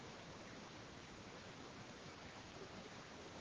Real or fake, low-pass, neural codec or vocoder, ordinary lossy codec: fake; 7.2 kHz; codec, 16 kHz, 6 kbps, DAC; Opus, 16 kbps